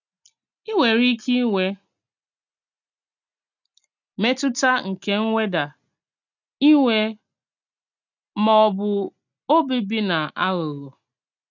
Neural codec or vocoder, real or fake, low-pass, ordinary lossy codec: none; real; 7.2 kHz; none